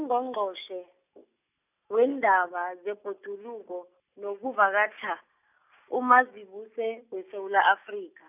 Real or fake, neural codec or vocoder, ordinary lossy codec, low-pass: real; none; none; 3.6 kHz